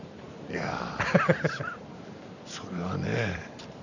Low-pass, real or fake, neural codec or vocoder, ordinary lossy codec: 7.2 kHz; fake; vocoder, 44.1 kHz, 128 mel bands every 512 samples, BigVGAN v2; none